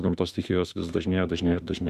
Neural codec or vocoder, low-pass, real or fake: autoencoder, 48 kHz, 32 numbers a frame, DAC-VAE, trained on Japanese speech; 14.4 kHz; fake